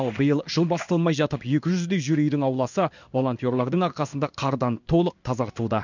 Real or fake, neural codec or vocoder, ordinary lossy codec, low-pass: fake; codec, 16 kHz in and 24 kHz out, 1 kbps, XY-Tokenizer; none; 7.2 kHz